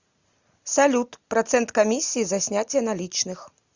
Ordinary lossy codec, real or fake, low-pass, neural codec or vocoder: Opus, 64 kbps; real; 7.2 kHz; none